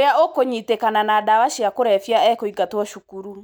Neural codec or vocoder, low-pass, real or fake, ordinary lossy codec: none; none; real; none